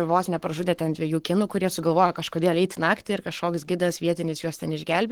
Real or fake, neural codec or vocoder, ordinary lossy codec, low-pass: fake; codec, 44.1 kHz, 7.8 kbps, Pupu-Codec; Opus, 16 kbps; 19.8 kHz